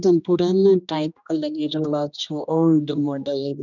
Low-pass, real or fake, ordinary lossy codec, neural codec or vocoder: 7.2 kHz; fake; none; codec, 16 kHz, 1 kbps, X-Codec, HuBERT features, trained on general audio